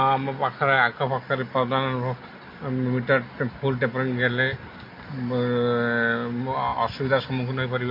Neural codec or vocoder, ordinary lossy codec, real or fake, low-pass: none; MP3, 32 kbps; real; 5.4 kHz